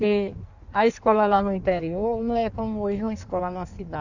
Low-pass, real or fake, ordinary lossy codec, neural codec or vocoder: 7.2 kHz; fake; none; codec, 16 kHz in and 24 kHz out, 1.1 kbps, FireRedTTS-2 codec